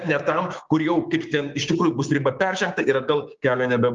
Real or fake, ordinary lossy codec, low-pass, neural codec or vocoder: fake; Opus, 24 kbps; 7.2 kHz; codec, 16 kHz, 6 kbps, DAC